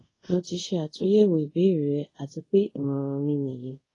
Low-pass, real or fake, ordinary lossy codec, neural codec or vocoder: 10.8 kHz; fake; AAC, 32 kbps; codec, 24 kHz, 0.5 kbps, DualCodec